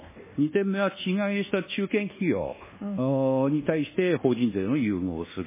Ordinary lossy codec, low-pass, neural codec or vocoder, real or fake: MP3, 16 kbps; 3.6 kHz; codec, 24 kHz, 1.2 kbps, DualCodec; fake